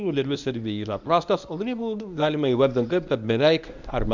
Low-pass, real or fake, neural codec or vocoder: 7.2 kHz; fake; codec, 24 kHz, 0.9 kbps, WavTokenizer, medium speech release version 1